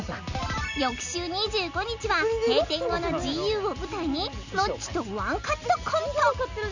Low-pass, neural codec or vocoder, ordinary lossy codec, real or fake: 7.2 kHz; none; none; real